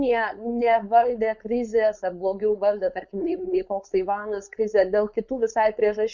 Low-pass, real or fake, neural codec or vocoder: 7.2 kHz; fake; codec, 16 kHz, 4.8 kbps, FACodec